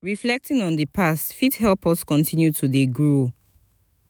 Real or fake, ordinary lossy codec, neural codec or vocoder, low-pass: fake; none; autoencoder, 48 kHz, 128 numbers a frame, DAC-VAE, trained on Japanese speech; none